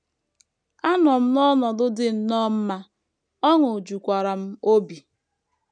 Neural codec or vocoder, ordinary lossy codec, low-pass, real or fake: none; none; 9.9 kHz; real